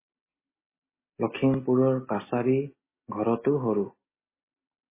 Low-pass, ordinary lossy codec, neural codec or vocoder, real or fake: 3.6 kHz; MP3, 16 kbps; none; real